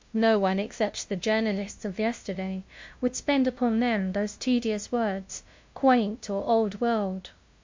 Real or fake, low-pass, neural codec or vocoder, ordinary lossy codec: fake; 7.2 kHz; codec, 16 kHz, 0.5 kbps, FunCodec, trained on LibriTTS, 25 frames a second; MP3, 48 kbps